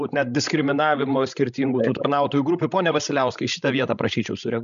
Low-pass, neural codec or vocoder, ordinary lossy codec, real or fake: 7.2 kHz; codec, 16 kHz, 16 kbps, FreqCodec, larger model; MP3, 96 kbps; fake